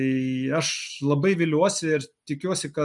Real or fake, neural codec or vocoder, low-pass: real; none; 10.8 kHz